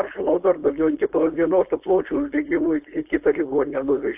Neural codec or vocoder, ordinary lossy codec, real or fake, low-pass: codec, 16 kHz, 4.8 kbps, FACodec; AAC, 32 kbps; fake; 3.6 kHz